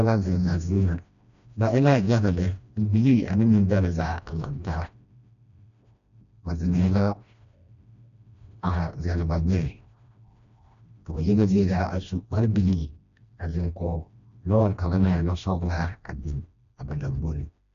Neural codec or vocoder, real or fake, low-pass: codec, 16 kHz, 1 kbps, FreqCodec, smaller model; fake; 7.2 kHz